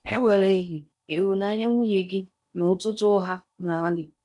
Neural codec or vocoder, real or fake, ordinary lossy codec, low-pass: codec, 16 kHz in and 24 kHz out, 0.6 kbps, FocalCodec, streaming, 4096 codes; fake; none; 10.8 kHz